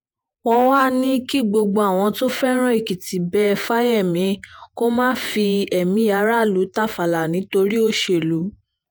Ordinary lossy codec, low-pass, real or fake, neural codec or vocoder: none; none; fake; vocoder, 48 kHz, 128 mel bands, Vocos